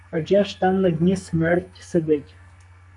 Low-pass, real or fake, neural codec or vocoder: 10.8 kHz; fake; codec, 44.1 kHz, 2.6 kbps, SNAC